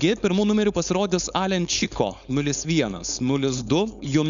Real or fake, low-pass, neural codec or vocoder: fake; 7.2 kHz; codec, 16 kHz, 4.8 kbps, FACodec